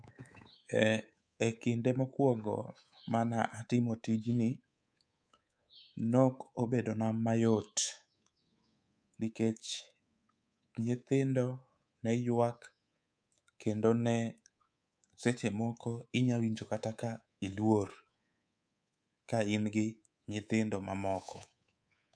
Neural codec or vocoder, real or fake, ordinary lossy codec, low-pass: codec, 24 kHz, 3.1 kbps, DualCodec; fake; none; 9.9 kHz